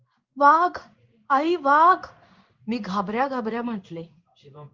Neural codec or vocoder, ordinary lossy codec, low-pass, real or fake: codec, 16 kHz in and 24 kHz out, 1 kbps, XY-Tokenizer; Opus, 32 kbps; 7.2 kHz; fake